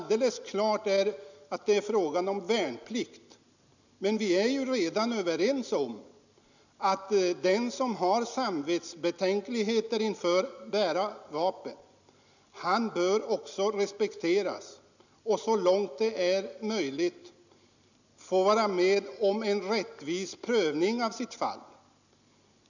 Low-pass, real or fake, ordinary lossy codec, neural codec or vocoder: 7.2 kHz; real; none; none